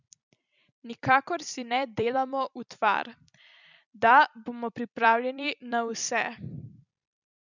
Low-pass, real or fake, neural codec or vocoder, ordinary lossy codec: 7.2 kHz; fake; vocoder, 44.1 kHz, 128 mel bands every 256 samples, BigVGAN v2; none